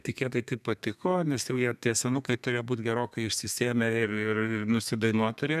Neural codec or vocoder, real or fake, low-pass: codec, 32 kHz, 1.9 kbps, SNAC; fake; 14.4 kHz